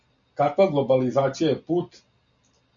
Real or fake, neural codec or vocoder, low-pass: real; none; 7.2 kHz